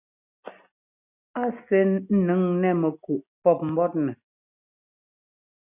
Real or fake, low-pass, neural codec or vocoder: real; 3.6 kHz; none